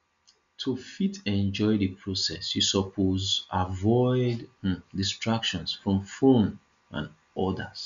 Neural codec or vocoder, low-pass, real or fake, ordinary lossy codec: none; 7.2 kHz; real; MP3, 64 kbps